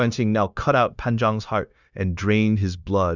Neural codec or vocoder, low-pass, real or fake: codec, 16 kHz, 0.9 kbps, LongCat-Audio-Codec; 7.2 kHz; fake